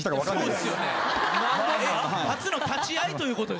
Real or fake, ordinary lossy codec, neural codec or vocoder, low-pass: real; none; none; none